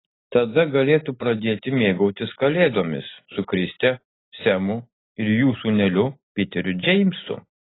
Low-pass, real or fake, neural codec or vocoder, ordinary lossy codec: 7.2 kHz; real; none; AAC, 16 kbps